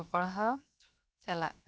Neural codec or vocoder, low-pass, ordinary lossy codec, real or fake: codec, 16 kHz, 0.7 kbps, FocalCodec; none; none; fake